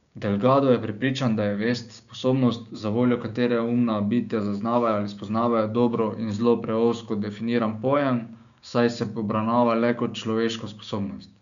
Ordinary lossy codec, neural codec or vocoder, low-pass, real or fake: none; codec, 16 kHz, 6 kbps, DAC; 7.2 kHz; fake